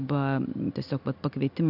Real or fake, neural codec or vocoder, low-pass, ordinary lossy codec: real; none; 5.4 kHz; AAC, 48 kbps